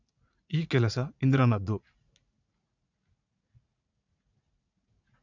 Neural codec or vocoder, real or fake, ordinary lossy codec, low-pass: vocoder, 44.1 kHz, 80 mel bands, Vocos; fake; none; 7.2 kHz